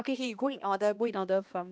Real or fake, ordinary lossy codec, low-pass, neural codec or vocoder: fake; none; none; codec, 16 kHz, 1 kbps, X-Codec, HuBERT features, trained on balanced general audio